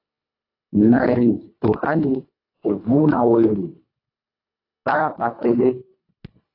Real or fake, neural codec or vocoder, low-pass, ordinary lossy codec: fake; codec, 24 kHz, 1.5 kbps, HILCodec; 5.4 kHz; AAC, 32 kbps